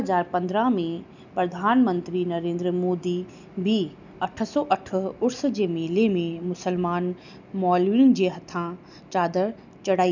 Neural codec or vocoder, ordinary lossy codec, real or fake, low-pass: none; none; real; 7.2 kHz